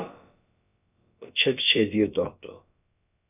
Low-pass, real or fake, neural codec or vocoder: 3.6 kHz; fake; codec, 16 kHz, about 1 kbps, DyCAST, with the encoder's durations